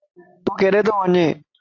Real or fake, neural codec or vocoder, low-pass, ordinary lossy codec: real; none; 7.2 kHz; AAC, 48 kbps